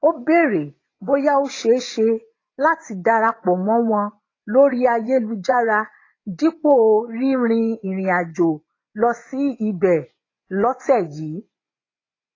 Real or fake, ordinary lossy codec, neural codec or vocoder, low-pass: fake; AAC, 32 kbps; vocoder, 24 kHz, 100 mel bands, Vocos; 7.2 kHz